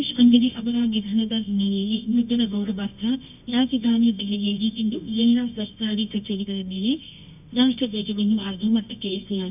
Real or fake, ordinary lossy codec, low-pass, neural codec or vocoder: fake; none; 3.6 kHz; codec, 24 kHz, 0.9 kbps, WavTokenizer, medium music audio release